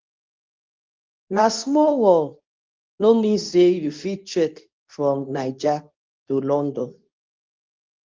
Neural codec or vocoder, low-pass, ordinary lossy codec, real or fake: codec, 24 kHz, 0.9 kbps, WavTokenizer, medium speech release version 2; 7.2 kHz; Opus, 24 kbps; fake